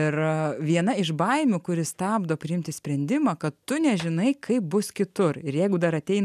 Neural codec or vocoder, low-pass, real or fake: none; 14.4 kHz; real